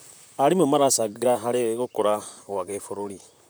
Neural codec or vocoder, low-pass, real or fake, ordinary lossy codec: vocoder, 44.1 kHz, 128 mel bands, Pupu-Vocoder; none; fake; none